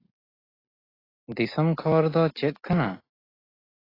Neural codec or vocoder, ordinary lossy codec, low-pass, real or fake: none; AAC, 24 kbps; 5.4 kHz; real